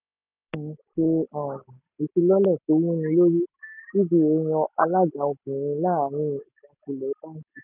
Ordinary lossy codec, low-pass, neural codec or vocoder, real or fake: none; 3.6 kHz; none; real